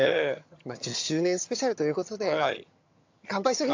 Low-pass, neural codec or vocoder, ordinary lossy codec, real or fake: 7.2 kHz; vocoder, 22.05 kHz, 80 mel bands, HiFi-GAN; AAC, 48 kbps; fake